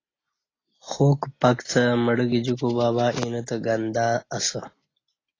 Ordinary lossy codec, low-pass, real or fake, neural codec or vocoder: AAC, 32 kbps; 7.2 kHz; real; none